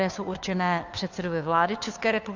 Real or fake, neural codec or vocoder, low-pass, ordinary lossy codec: fake; codec, 16 kHz, 2 kbps, FunCodec, trained on Chinese and English, 25 frames a second; 7.2 kHz; AAC, 48 kbps